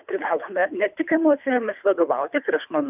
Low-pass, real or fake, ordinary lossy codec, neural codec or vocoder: 3.6 kHz; fake; AAC, 32 kbps; codec, 24 kHz, 3 kbps, HILCodec